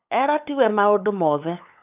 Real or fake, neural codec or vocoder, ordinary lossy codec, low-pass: fake; codec, 16 kHz, 8 kbps, FunCodec, trained on LibriTTS, 25 frames a second; none; 3.6 kHz